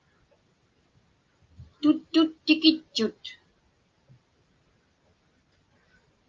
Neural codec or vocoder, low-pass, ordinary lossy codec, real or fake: none; 7.2 kHz; Opus, 24 kbps; real